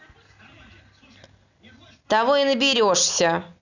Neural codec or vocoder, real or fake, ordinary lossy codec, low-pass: none; real; none; 7.2 kHz